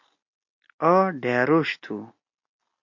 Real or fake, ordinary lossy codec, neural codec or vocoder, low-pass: real; MP3, 48 kbps; none; 7.2 kHz